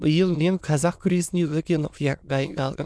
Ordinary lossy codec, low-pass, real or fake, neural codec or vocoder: none; none; fake; autoencoder, 22.05 kHz, a latent of 192 numbers a frame, VITS, trained on many speakers